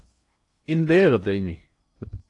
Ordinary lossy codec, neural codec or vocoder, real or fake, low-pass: AAC, 48 kbps; codec, 16 kHz in and 24 kHz out, 0.8 kbps, FocalCodec, streaming, 65536 codes; fake; 10.8 kHz